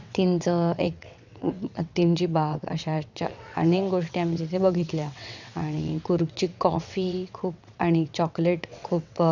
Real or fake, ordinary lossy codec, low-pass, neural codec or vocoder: fake; none; 7.2 kHz; vocoder, 22.05 kHz, 80 mel bands, WaveNeXt